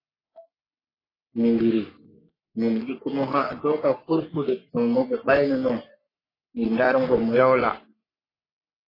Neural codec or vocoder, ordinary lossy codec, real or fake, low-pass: codec, 44.1 kHz, 3.4 kbps, Pupu-Codec; AAC, 24 kbps; fake; 5.4 kHz